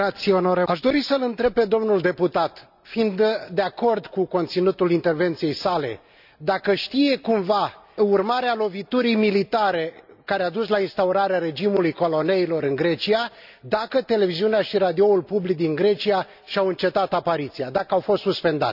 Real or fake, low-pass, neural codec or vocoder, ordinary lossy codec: real; 5.4 kHz; none; none